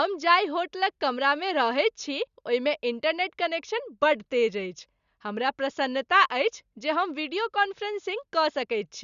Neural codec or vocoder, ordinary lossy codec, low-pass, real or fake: none; Opus, 64 kbps; 7.2 kHz; real